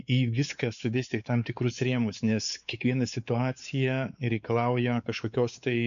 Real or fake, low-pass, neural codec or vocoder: fake; 7.2 kHz; codec, 16 kHz, 4 kbps, X-Codec, WavLM features, trained on Multilingual LibriSpeech